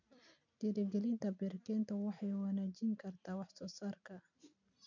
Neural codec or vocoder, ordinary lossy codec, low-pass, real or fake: none; none; 7.2 kHz; real